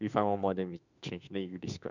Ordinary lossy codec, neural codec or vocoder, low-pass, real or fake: none; autoencoder, 48 kHz, 32 numbers a frame, DAC-VAE, trained on Japanese speech; 7.2 kHz; fake